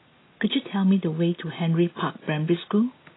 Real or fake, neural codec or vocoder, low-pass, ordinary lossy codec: real; none; 7.2 kHz; AAC, 16 kbps